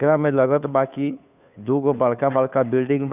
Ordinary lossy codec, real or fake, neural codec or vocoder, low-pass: none; fake; codec, 16 kHz, 2 kbps, FunCodec, trained on Chinese and English, 25 frames a second; 3.6 kHz